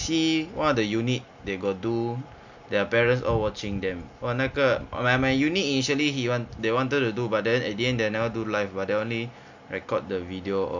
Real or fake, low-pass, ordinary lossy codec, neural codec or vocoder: real; 7.2 kHz; none; none